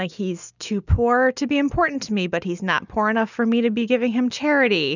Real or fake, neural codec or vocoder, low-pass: real; none; 7.2 kHz